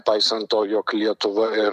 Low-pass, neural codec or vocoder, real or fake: 14.4 kHz; vocoder, 44.1 kHz, 128 mel bands every 256 samples, BigVGAN v2; fake